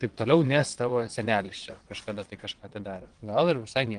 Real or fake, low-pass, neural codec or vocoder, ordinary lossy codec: fake; 9.9 kHz; vocoder, 22.05 kHz, 80 mel bands, WaveNeXt; Opus, 24 kbps